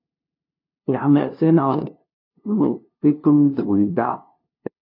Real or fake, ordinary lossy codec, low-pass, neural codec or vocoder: fake; MP3, 32 kbps; 5.4 kHz; codec, 16 kHz, 0.5 kbps, FunCodec, trained on LibriTTS, 25 frames a second